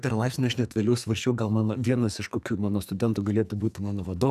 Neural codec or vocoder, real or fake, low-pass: codec, 44.1 kHz, 2.6 kbps, SNAC; fake; 14.4 kHz